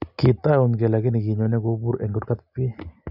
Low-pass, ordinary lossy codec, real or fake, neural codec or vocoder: 5.4 kHz; none; real; none